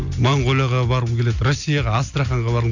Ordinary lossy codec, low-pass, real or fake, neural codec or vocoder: none; 7.2 kHz; real; none